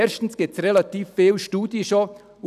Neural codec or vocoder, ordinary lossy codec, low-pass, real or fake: none; none; 14.4 kHz; real